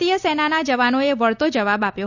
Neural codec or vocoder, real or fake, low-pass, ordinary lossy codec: none; real; 7.2 kHz; none